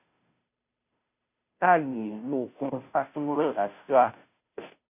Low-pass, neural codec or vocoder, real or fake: 3.6 kHz; codec, 16 kHz, 0.5 kbps, FunCodec, trained on Chinese and English, 25 frames a second; fake